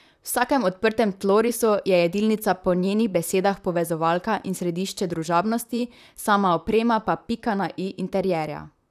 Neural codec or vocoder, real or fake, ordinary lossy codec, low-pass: vocoder, 44.1 kHz, 128 mel bands, Pupu-Vocoder; fake; none; 14.4 kHz